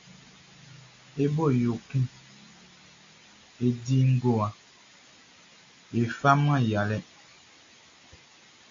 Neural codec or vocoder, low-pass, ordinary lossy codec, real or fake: none; 7.2 kHz; AAC, 48 kbps; real